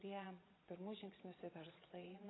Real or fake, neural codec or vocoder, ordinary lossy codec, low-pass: fake; vocoder, 22.05 kHz, 80 mel bands, Vocos; AAC, 16 kbps; 7.2 kHz